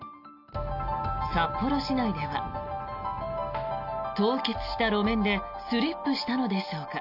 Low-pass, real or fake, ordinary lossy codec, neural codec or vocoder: 5.4 kHz; real; none; none